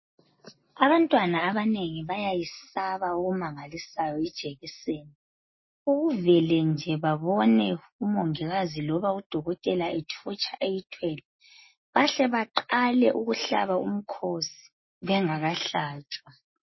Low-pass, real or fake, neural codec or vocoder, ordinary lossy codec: 7.2 kHz; real; none; MP3, 24 kbps